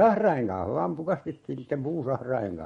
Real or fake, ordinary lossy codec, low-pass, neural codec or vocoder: fake; MP3, 48 kbps; 19.8 kHz; codec, 44.1 kHz, 7.8 kbps, Pupu-Codec